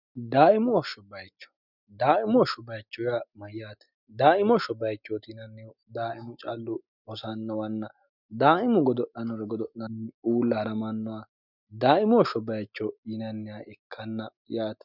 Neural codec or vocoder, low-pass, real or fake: none; 5.4 kHz; real